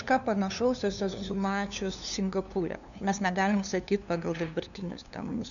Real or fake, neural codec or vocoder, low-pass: fake; codec, 16 kHz, 2 kbps, FunCodec, trained on LibriTTS, 25 frames a second; 7.2 kHz